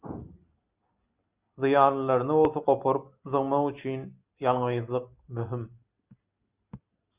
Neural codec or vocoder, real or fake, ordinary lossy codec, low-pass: none; real; Opus, 64 kbps; 3.6 kHz